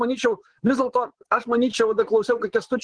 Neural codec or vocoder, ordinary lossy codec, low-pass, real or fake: vocoder, 24 kHz, 100 mel bands, Vocos; Opus, 16 kbps; 9.9 kHz; fake